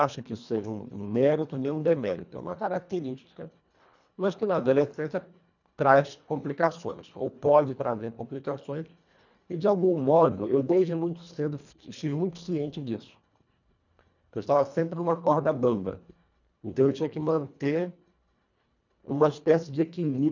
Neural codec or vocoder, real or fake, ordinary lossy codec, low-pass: codec, 24 kHz, 1.5 kbps, HILCodec; fake; none; 7.2 kHz